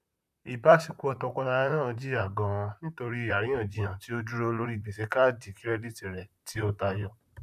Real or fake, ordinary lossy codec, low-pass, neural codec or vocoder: fake; none; 14.4 kHz; vocoder, 44.1 kHz, 128 mel bands, Pupu-Vocoder